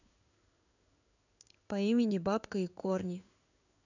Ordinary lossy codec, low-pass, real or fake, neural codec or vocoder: none; 7.2 kHz; fake; codec, 16 kHz in and 24 kHz out, 1 kbps, XY-Tokenizer